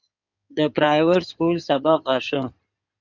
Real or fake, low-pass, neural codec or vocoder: fake; 7.2 kHz; codec, 16 kHz in and 24 kHz out, 2.2 kbps, FireRedTTS-2 codec